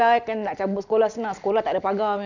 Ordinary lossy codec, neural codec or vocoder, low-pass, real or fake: none; none; 7.2 kHz; real